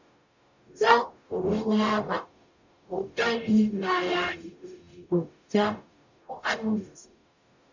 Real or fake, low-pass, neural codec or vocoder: fake; 7.2 kHz; codec, 44.1 kHz, 0.9 kbps, DAC